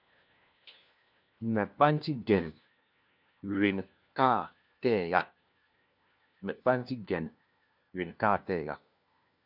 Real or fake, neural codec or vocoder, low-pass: fake; codec, 16 kHz, 1 kbps, FunCodec, trained on LibriTTS, 50 frames a second; 5.4 kHz